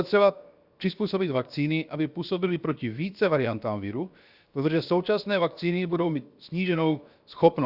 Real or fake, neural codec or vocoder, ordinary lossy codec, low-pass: fake; codec, 16 kHz, 0.7 kbps, FocalCodec; Opus, 64 kbps; 5.4 kHz